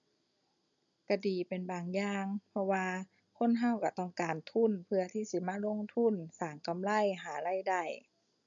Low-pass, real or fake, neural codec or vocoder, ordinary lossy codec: 7.2 kHz; real; none; none